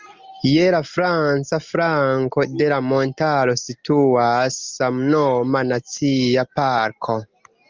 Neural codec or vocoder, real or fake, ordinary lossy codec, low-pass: none; real; Opus, 32 kbps; 7.2 kHz